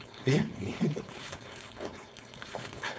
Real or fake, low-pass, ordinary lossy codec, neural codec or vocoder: fake; none; none; codec, 16 kHz, 4.8 kbps, FACodec